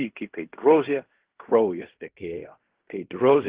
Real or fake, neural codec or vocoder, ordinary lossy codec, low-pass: fake; codec, 16 kHz in and 24 kHz out, 0.4 kbps, LongCat-Audio-Codec, fine tuned four codebook decoder; Opus, 16 kbps; 3.6 kHz